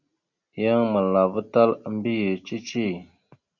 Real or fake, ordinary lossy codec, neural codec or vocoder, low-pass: real; Opus, 64 kbps; none; 7.2 kHz